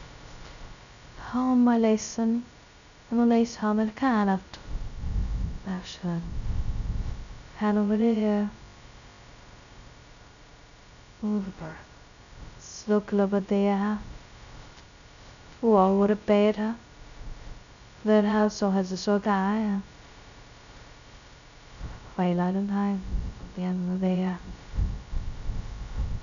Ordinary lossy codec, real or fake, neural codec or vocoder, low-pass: none; fake; codec, 16 kHz, 0.2 kbps, FocalCodec; 7.2 kHz